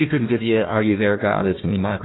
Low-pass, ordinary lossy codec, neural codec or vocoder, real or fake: 7.2 kHz; AAC, 16 kbps; codec, 16 kHz, 1 kbps, FunCodec, trained on Chinese and English, 50 frames a second; fake